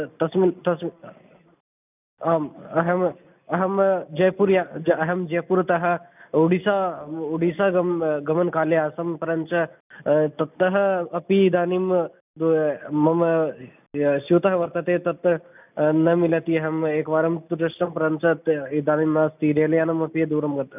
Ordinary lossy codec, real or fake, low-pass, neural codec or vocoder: none; real; 3.6 kHz; none